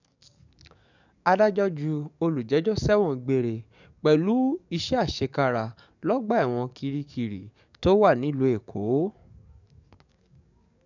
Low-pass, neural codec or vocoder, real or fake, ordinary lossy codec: 7.2 kHz; codec, 44.1 kHz, 7.8 kbps, DAC; fake; none